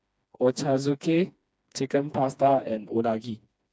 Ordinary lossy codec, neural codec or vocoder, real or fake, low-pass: none; codec, 16 kHz, 2 kbps, FreqCodec, smaller model; fake; none